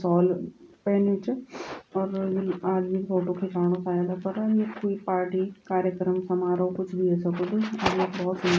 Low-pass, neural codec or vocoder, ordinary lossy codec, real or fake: none; none; none; real